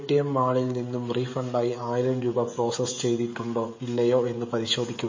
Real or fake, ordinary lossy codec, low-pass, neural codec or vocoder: fake; MP3, 32 kbps; 7.2 kHz; codec, 16 kHz, 16 kbps, FreqCodec, smaller model